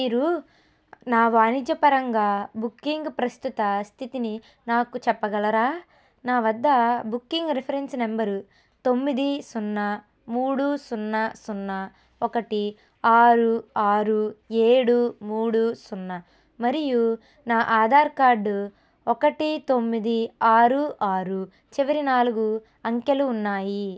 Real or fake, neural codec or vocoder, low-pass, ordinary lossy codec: real; none; none; none